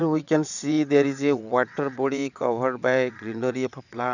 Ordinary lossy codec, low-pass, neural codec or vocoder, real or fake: none; 7.2 kHz; vocoder, 22.05 kHz, 80 mel bands, WaveNeXt; fake